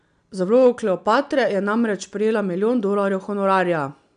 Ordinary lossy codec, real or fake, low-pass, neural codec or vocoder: none; real; 9.9 kHz; none